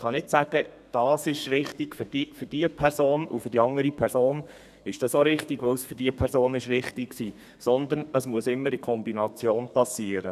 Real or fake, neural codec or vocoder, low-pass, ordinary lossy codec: fake; codec, 32 kHz, 1.9 kbps, SNAC; 14.4 kHz; none